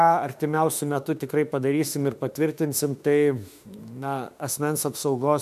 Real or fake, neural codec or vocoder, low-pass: fake; autoencoder, 48 kHz, 32 numbers a frame, DAC-VAE, trained on Japanese speech; 14.4 kHz